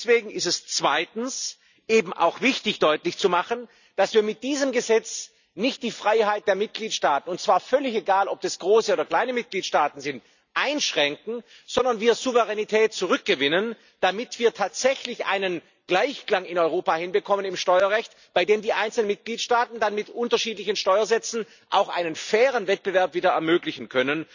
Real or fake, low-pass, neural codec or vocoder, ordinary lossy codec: real; 7.2 kHz; none; none